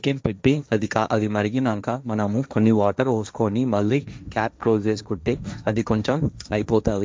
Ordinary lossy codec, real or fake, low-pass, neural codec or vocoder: none; fake; none; codec, 16 kHz, 1.1 kbps, Voila-Tokenizer